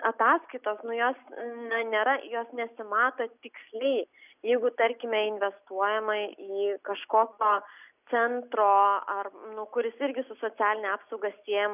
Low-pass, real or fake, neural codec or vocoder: 3.6 kHz; real; none